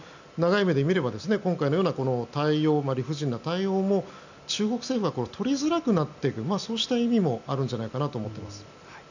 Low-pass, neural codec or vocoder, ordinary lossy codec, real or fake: 7.2 kHz; none; none; real